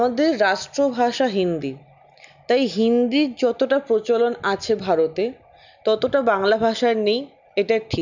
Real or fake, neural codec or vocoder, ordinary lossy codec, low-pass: real; none; none; 7.2 kHz